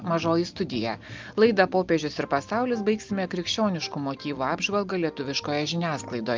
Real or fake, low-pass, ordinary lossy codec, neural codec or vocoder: real; 7.2 kHz; Opus, 24 kbps; none